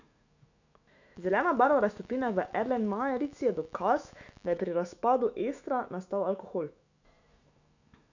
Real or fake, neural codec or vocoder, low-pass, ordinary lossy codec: fake; autoencoder, 48 kHz, 128 numbers a frame, DAC-VAE, trained on Japanese speech; 7.2 kHz; none